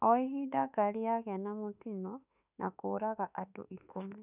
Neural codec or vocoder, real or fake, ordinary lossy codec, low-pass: autoencoder, 48 kHz, 32 numbers a frame, DAC-VAE, trained on Japanese speech; fake; none; 3.6 kHz